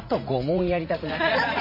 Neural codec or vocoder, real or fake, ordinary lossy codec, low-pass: vocoder, 44.1 kHz, 80 mel bands, Vocos; fake; MP3, 24 kbps; 5.4 kHz